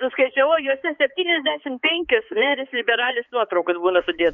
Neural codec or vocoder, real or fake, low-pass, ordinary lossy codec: codec, 16 kHz, 4 kbps, X-Codec, HuBERT features, trained on balanced general audio; fake; 7.2 kHz; MP3, 96 kbps